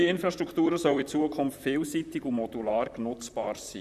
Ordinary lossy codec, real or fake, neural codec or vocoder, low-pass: none; fake; vocoder, 44.1 kHz, 128 mel bands, Pupu-Vocoder; 14.4 kHz